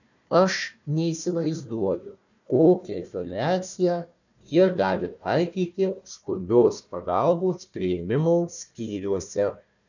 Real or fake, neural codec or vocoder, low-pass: fake; codec, 16 kHz, 1 kbps, FunCodec, trained on Chinese and English, 50 frames a second; 7.2 kHz